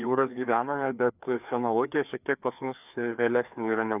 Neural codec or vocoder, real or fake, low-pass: codec, 16 kHz, 2 kbps, FreqCodec, larger model; fake; 3.6 kHz